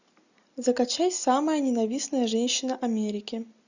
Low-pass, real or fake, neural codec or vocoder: 7.2 kHz; real; none